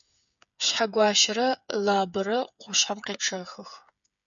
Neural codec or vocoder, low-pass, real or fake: codec, 16 kHz, 8 kbps, FreqCodec, smaller model; 7.2 kHz; fake